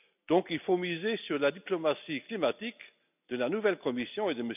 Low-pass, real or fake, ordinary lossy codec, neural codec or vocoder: 3.6 kHz; real; none; none